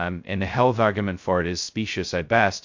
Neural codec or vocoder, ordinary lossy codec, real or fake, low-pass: codec, 16 kHz, 0.2 kbps, FocalCodec; MP3, 48 kbps; fake; 7.2 kHz